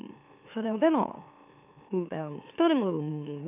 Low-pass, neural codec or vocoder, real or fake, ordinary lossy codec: 3.6 kHz; autoencoder, 44.1 kHz, a latent of 192 numbers a frame, MeloTTS; fake; none